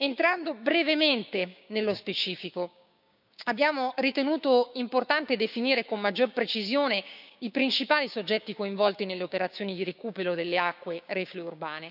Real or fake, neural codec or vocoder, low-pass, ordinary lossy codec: fake; codec, 16 kHz, 6 kbps, DAC; 5.4 kHz; none